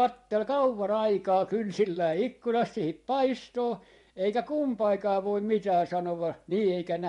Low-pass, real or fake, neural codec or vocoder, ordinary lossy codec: 10.8 kHz; fake; vocoder, 24 kHz, 100 mel bands, Vocos; MP3, 64 kbps